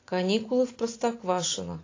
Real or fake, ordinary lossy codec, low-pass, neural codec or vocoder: real; AAC, 32 kbps; 7.2 kHz; none